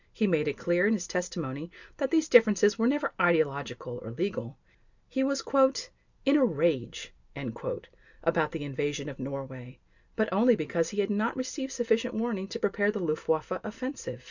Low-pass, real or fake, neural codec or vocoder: 7.2 kHz; real; none